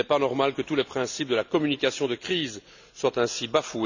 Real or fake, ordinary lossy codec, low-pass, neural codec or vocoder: real; none; 7.2 kHz; none